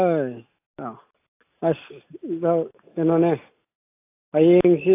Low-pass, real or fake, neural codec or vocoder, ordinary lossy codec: 3.6 kHz; real; none; AAC, 24 kbps